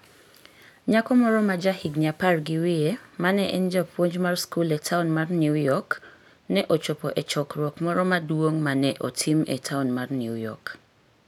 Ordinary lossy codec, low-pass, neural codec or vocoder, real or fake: none; 19.8 kHz; none; real